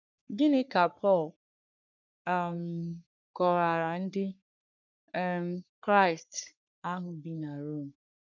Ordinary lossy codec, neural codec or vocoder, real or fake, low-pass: none; codec, 44.1 kHz, 3.4 kbps, Pupu-Codec; fake; 7.2 kHz